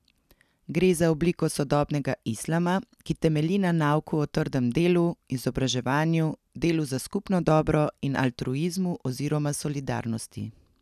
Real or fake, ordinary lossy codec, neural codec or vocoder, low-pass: real; none; none; 14.4 kHz